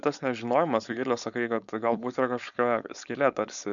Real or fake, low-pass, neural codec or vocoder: fake; 7.2 kHz; codec, 16 kHz, 4.8 kbps, FACodec